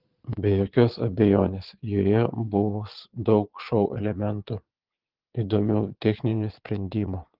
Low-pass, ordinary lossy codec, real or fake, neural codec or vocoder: 5.4 kHz; Opus, 16 kbps; fake; vocoder, 22.05 kHz, 80 mel bands, Vocos